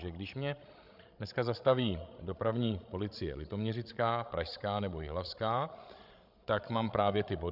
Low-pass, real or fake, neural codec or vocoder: 5.4 kHz; fake; codec, 16 kHz, 16 kbps, FreqCodec, larger model